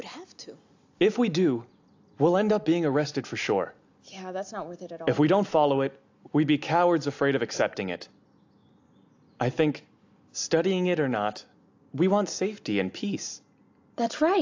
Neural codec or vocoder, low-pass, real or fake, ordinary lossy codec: none; 7.2 kHz; real; AAC, 48 kbps